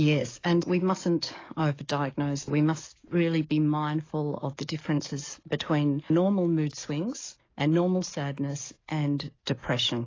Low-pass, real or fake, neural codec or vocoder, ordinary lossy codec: 7.2 kHz; fake; codec, 44.1 kHz, 7.8 kbps, DAC; AAC, 32 kbps